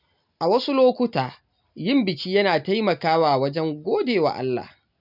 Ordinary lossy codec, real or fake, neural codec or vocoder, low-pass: none; real; none; 5.4 kHz